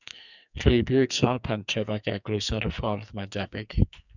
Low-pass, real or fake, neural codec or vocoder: 7.2 kHz; fake; codec, 44.1 kHz, 2.6 kbps, SNAC